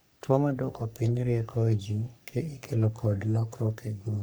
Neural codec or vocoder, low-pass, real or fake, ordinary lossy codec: codec, 44.1 kHz, 3.4 kbps, Pupu-Codec; none; fake; none